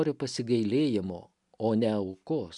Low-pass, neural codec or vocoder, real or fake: 10.8 kHz; none; real